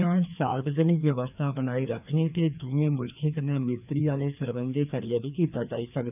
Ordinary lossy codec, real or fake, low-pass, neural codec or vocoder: none; fake; 3.6 kHz; codec, 16 kHz, 2 kbps, FreqCodec, larger model